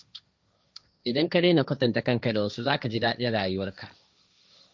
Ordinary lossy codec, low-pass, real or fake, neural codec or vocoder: none; none; fake; codec, 16 kHz, 1.1 kbps, Voila-Tokenizer